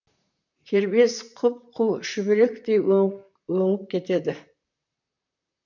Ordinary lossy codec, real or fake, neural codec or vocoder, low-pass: none; fake; vocoder, 44.1 kHz, 128 mel bands, Pupu-Vocoder; 7.2 kHz